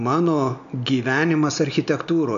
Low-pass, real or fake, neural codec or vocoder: 7.2 kHz; real; none